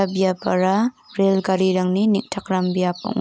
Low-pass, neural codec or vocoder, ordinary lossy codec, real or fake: none; none; none; real